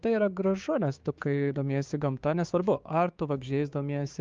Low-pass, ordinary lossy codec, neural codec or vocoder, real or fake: 7.2 kHz; Opus, 24 kbps; codec, 16 kHz, 6 kbps, DAC; fake